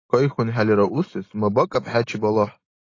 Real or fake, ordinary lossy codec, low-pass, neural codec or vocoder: real; AAC, 32 kbps; 7.2 kHz; none